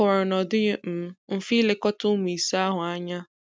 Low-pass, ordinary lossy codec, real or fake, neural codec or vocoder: none; none; real; none